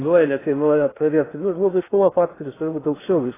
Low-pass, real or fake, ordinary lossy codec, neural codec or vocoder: 3.6 kHz; fake; AAC, 16 kbps; codec, 16 kHz in and 24 kHz out, 0.6 kbps, FocalCodec, streaming, 2048 codes